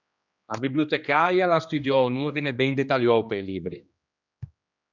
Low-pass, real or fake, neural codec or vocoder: 7.2 kHz; fake; codec, 16 kHz, 2 kbps, X-Codec, HuBERT features, trained on general audio